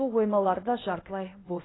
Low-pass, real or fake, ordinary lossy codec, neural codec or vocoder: 7.2 kHz; fake; AAC, 16 kbps; codec, 16 kHz, 0.8 kbps, ZipCodec